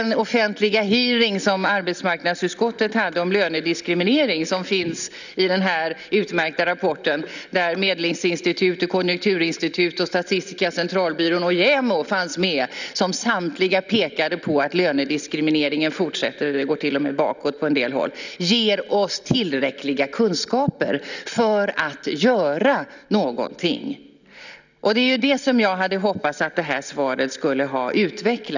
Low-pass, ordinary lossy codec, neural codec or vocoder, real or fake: 7.2 kHz; none; none; real